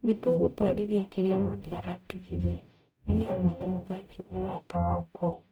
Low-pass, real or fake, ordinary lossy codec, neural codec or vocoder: none; fake; none; codec, 44.1 kHz, 0.9 kbps, DAC